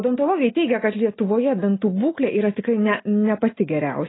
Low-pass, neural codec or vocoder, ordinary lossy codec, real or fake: 7.2 kHz; none; AAC, 16 kbps; real